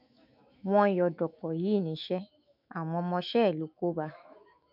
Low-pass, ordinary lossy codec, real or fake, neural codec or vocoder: 5.4 kHz; none; fake; autoencoder, 48 kHz, 128 numbers a frame, DAC-VAE, trained on Japanese speech